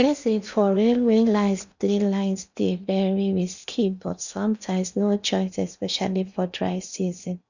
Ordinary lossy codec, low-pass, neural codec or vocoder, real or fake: none; 7.2 kHz; codec, 16 kHz in and 24 kHz out, 0.8 kbps, FocalCodec, streaming, 65536 codes; fake